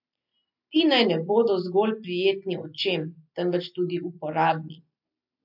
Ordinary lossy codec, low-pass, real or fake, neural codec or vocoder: MP3, 48 kbps; 5.4 kHz; real; none